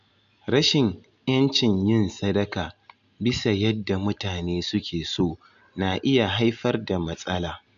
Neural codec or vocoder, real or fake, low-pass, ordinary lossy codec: none; real; 7.2 kHz; none